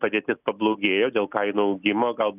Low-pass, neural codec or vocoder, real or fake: 3.6 kHz; none; real